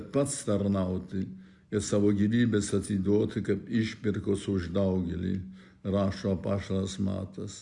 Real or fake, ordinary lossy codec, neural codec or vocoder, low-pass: real; Opus, 64 kbps; none; 10.8 kHz